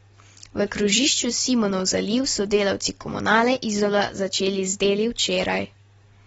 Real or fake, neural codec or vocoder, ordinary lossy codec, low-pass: real; none; AAC, 24 kbps; 19.8 kHz